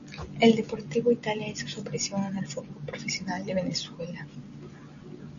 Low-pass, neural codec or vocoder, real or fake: 7.2 kHz; none; real